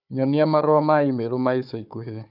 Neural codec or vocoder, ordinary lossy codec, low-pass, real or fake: codec, 16 kHz, 4 kbps, FunCodec, trained on Chinese and English, 50 frames a second; none; 5.4 kHz; fake